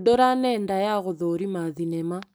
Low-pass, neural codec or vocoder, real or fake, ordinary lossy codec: none; none; real; none